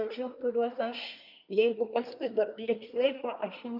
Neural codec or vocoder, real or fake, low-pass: codec, 24 kHz, 1 kbps, SNAC; fake; 5.4 kHz